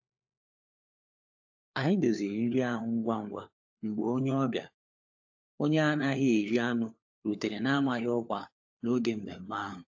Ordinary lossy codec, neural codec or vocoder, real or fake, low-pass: AAC, 48 kbps; codec, 16 kHz, 4 kbps, FunCodec, trained on LibriTTS, 50 frames a second; fake; 7.2 kHz